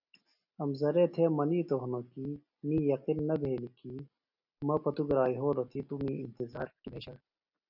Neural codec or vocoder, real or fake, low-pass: none; real; 5.4 kHz